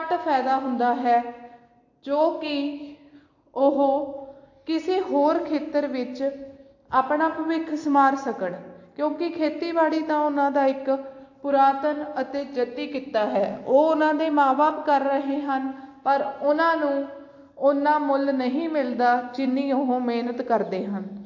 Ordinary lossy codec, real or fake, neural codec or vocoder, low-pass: AAC, 48 kbps; real; none; 7.2 kHz